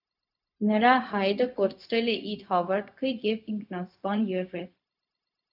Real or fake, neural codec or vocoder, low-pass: fake; codec, 16 kHz, 0.4 kbps, LongCat-Audio-Codec; 5.4 kHz